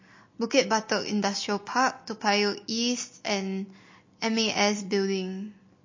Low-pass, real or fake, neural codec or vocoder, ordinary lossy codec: 7.2 kHz; real; none; MP3, 32 kbps